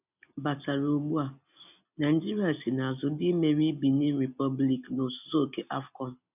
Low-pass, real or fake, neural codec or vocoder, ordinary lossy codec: 3.6 kHz; real; none; none